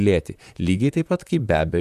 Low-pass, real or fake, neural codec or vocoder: 14.4 kHz; fake; vocoder, 44.1 kHz, 128 mel bands, Pupu-Vocoder